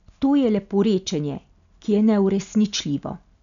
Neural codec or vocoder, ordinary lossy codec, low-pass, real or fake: none; none; 7.2 kHz; real